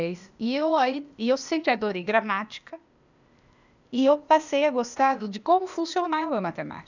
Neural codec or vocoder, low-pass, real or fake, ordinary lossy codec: codec, 16 kHz, 0.8 kbps, ZipCodec; 7.2 kHz; fake; none